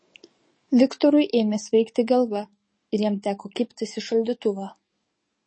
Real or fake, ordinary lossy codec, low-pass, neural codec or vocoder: fake; MP3, 32 kbps; 9.9 kHz; codec, 44.1 kHz, 7.8 kbps, DAC